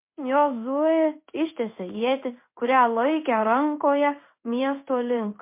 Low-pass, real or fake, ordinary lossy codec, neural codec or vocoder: 3.6 kHz; fake; MP3, 24 kbps; codec, 16 kHz in and 24 kHz out, 1 kbps, XY-Tokenizer